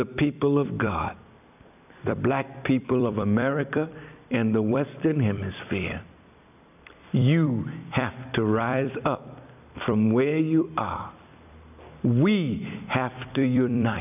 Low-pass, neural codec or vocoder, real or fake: 3.6 kHz; vocoder, 44.1 kHz, 128 mel bands every 256 samples, BigVGAN v2; fake